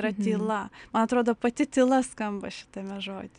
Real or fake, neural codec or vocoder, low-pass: real; none; 9.9 kHz